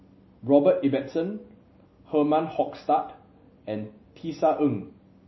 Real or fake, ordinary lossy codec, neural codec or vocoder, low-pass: real; MP3, 24 kbps; none; 7.2 kHz